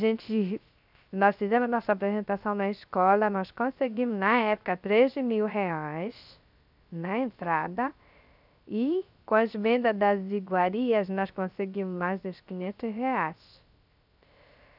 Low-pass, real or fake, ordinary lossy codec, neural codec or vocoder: 5.4 kHz; fake; none; codec, 16 kHz, 0.3 kbps, FocalCodec